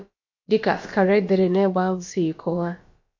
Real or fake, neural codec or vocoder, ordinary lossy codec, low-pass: fake; codec, 16 kHz, about 1 kbps, DyCAST, with the encoder's durations; MP3, 48 kbps; 7.2 kHz